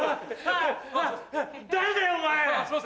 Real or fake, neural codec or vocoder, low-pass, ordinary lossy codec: real; none; none; none